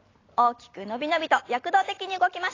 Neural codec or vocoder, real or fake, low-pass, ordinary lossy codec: none; real; 7.2 kHz; AAC, 32 kbps